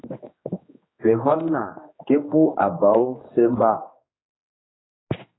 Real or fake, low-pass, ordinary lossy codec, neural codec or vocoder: fake; 7.2 kHz; AAC, 16 kbps; codec, 16 kHz, 2 kbps, X-Codec, HuBERT features, trained on general audio